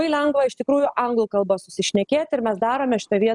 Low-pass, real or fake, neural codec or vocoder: 10.8 kHz; real; none